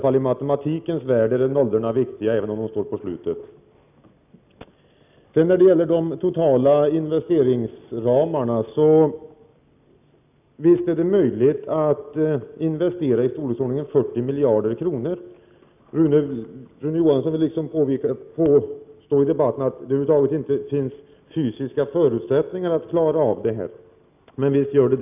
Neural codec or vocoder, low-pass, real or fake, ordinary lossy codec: none; 3.6 kHz; real; none